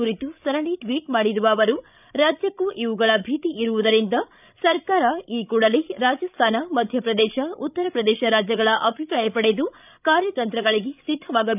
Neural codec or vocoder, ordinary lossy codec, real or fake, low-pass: codec, 16 kHz, 16 kbps, FreqCodec, larger model; none; fake; 3.6 kHz